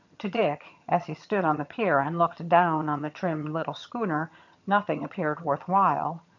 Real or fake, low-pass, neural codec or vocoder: fake; 7.2 kHz; vocoder, 22.05 kHz, 80 mel bands, HiFi-GAN